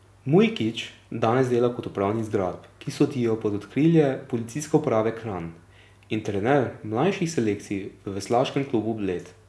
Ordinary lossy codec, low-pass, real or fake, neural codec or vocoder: none; none; real; none